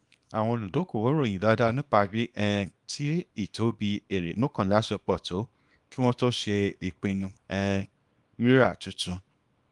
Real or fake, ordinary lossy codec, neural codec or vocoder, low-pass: fake; Opus, 32 kbps; codec, 24 kHz, 0.9 kbps, WavTokenizer, small release; 10.8 kHz